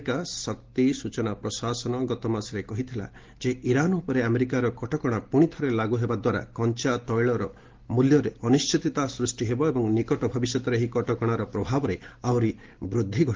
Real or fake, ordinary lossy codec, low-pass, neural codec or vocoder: real; Opus, 16 kbps; 7.2 kHz; none